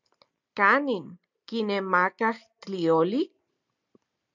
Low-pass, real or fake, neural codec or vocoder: 7.2 kHz; real; none